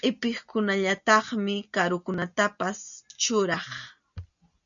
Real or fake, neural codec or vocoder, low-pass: real; none; 7.2 kHz